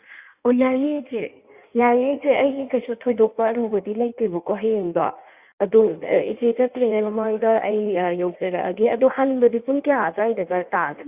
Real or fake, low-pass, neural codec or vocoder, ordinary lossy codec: fake; 3.6 kHz; codec, 16 kHz in and 24 kHz out, 1.1 kbps, FireRedTTS-2 codec; Opus, 64 kbps